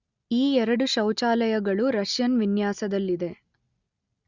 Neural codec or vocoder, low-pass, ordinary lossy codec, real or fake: none; 7.2 kHz; Opus, 64 kbps; real